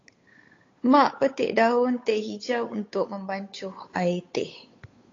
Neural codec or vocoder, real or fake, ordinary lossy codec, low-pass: codec, 16 kHz, 8 kbps, FunCodec, trained on Chinese and English, 25 frames a second; fake; AAC, 32 kbps; 7.2 kHz